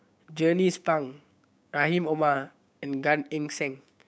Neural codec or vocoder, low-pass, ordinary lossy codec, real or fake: codec, 16 kHz, 6 kbps, DAC; none; none; fake